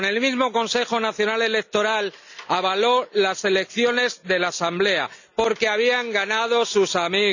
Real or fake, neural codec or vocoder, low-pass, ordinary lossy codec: real; none; 7.2 kHz; none